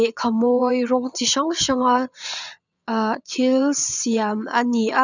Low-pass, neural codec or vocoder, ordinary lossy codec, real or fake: 7.2 kHz; vocoder, 22.05 kHz, 80 mel bands, WaveNeXt; none; fake